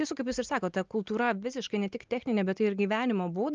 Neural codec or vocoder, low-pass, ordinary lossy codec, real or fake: none; 7.2 kHz; Opus, 24 kbps; real